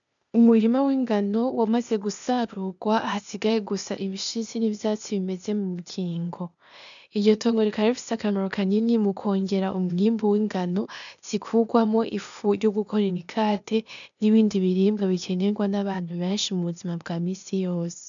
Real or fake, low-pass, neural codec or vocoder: fake; 7.2 kHz; codec, 16 kHz, 0.8 kbps, ZipCodec